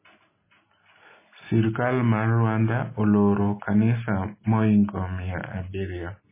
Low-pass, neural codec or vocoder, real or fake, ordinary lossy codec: 3.6 kHz; none; real; MP3, 16 kbps